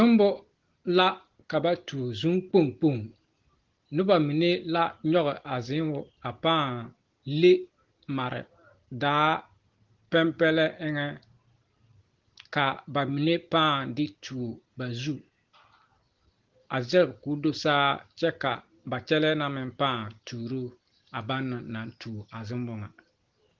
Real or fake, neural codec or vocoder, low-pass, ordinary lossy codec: real; none; 7.2 kHz; Opus, 16 kbps